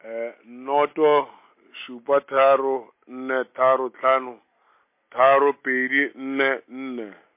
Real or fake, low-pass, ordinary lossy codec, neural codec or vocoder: real; 3.6 kHz; MP3, 24 kbps; none